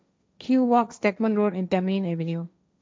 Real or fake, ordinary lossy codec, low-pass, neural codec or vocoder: fake; none; none; codec, 16 kHz, 1.1 kbps, Voila-Tokenizer